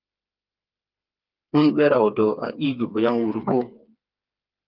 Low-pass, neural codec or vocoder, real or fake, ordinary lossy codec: 5.4 kHz; codec, 16 kHz, 4 kbps, FreqCodec, smaller model; fake; Opus, 32 kbps